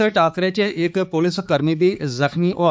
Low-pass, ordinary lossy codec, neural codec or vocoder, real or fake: none; none; codec, 16 kHz, 4 kbps, X-Codec, HuBERT features, trained on balanced general audio; fake